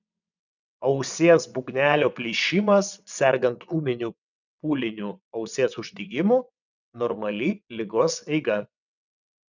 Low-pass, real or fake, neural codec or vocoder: 7.2 kHz; fake; vocoder, 22.05 kHz, 80 mel bands, WaveNeXt